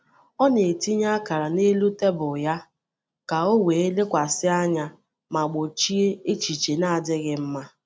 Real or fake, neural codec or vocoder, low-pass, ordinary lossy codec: real; none; none; none